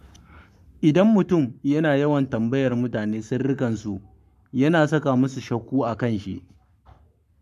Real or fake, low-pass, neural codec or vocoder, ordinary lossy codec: fake; 14.4 kHz; codec, 44.1 kHz, 7.8 kbps, Pupu-Codec; none